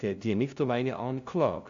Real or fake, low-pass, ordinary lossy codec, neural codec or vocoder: fake; 7.2 kHz; AAC, 64 kbps; codec, 16 kHz, 0.5 kbps, FunCodec, trained on LibriTTS, 25 frames a second